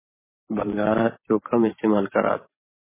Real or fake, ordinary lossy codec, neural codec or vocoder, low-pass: fake; MP3, 16 kbps; vocoder, 44.1 kHz, 128 mel bands every 512 samples, BigVGAN v2; 3.6 kHz